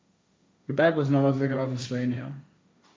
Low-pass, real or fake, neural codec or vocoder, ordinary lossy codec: none; fake; codec, 16 kHz, 1.1 kbps, Voila-Tokenizer; none